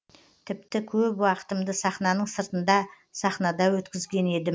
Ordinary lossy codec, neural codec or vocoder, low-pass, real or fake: none; none; none; real